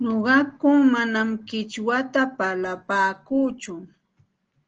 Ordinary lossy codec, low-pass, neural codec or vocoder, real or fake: Opus, 16 kbps; 7.2 kHz; none; real